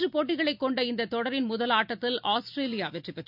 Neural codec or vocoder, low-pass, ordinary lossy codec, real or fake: none; 5.4 kHz; none; real